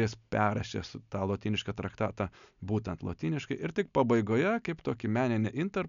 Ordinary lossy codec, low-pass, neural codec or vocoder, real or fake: MP3, 96 kbps; 7.2 kHz; none; real